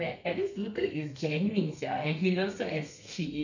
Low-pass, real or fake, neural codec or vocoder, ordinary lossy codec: 7.2 kHz; fake; codec, 32 kHz, 1.9 kbps, SNAC; none